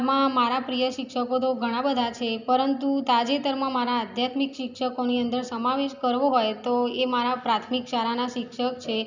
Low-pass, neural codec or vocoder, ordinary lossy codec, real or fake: 7.2 kHz; none; none; real